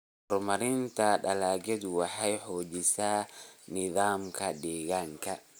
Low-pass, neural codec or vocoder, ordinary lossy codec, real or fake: none; none; none; real